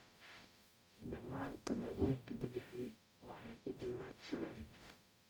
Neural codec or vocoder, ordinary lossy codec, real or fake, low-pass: codec, 44.1 kHz, 0.9 kbps, DAC; none; fake; none